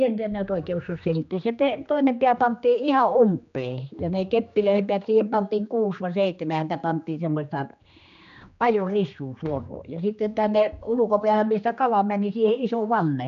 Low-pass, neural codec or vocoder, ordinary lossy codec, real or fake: 7.2 kHz; codec, 16 kHz, 2 kbps, X-Codec, HuBERT features, trained on general audio; MP3, 96 kbps; fake